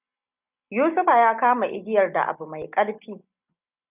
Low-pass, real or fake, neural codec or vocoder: 3.6 kHz; real; none